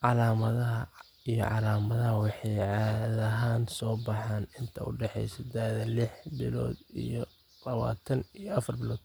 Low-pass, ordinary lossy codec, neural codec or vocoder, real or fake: none; none; none; real